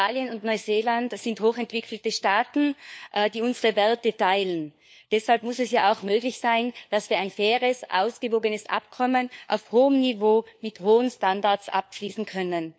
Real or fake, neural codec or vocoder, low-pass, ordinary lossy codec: fake; codec, 16 kHz, 4 kbps, FunCodec, trained on LibriTTS, 50 frames a second; none; none